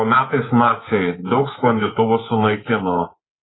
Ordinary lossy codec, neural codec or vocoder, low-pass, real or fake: AAC, 16 kbps; codec, 24 kHz, 3.1 kbps, DualCodec; 7.2 kHz; fake